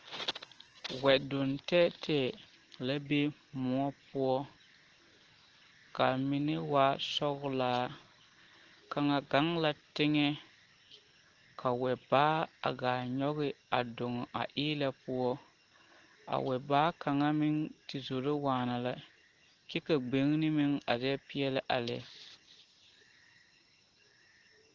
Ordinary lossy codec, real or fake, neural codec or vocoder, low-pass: Opus, 16 kbps; real; none; 7.2 kHz